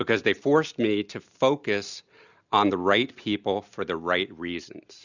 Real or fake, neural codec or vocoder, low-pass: real; none; 7.2 kHz